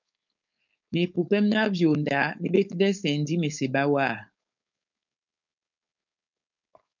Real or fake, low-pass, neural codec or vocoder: fake; 7.2 kHz; codec, 16 kHz, 4.8 kbps, FACodec